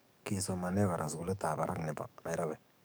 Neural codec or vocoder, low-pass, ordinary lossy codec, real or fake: codec, 44.1 kHz, 7.8 kbps, DAC; none; none; fake